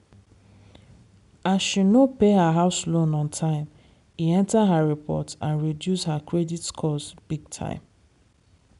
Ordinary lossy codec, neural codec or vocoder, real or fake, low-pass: none; none; real; 10.8 kHz